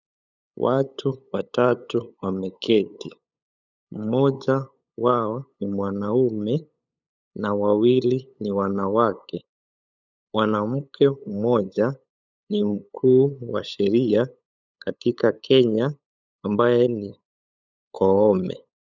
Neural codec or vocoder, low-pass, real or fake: codec, 16 kHz, 8 kbps, FunCodec, trained on LibriTTS, 25 frames a second; 7.2 kHz; fake